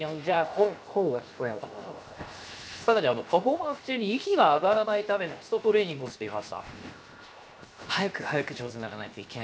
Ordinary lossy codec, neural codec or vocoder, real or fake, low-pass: none; codec, 16 kHz, 0.7 kbps, FocalCodec; fake; none